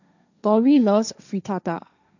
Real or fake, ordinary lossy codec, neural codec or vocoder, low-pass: fake; none; codec, 16 kHz, 1.1 kbps, Voila-Tokenizer; 7.2 kHz